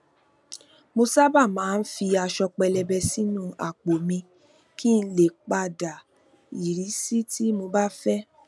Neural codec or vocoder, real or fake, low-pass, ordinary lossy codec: none; real; none; none